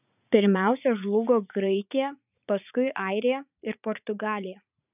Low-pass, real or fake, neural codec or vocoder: 3.6 kHz; fake; vocoder, 44.1 kHz, 80 mel bands, Vocos